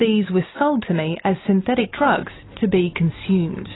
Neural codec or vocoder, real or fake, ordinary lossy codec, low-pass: none; real; AAC, 16 kbps; 7.2 kHz